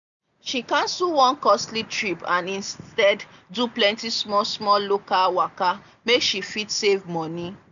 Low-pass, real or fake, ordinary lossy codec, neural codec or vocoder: 7.2 kHz; real; none; none